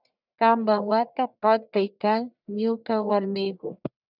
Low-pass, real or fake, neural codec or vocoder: 5.4 kHz; fake; codec, 44.1 kHz, 1.7 kbps, Pupu-Codec